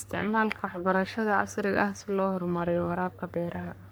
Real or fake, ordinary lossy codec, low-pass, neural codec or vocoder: fake; none; none; codec, 44.1 kHz, 3.4 kbps, Pupu-Codec